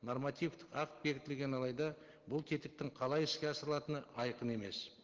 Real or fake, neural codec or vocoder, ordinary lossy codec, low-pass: real; none; Opus, 16 kbps; 7.2 kHz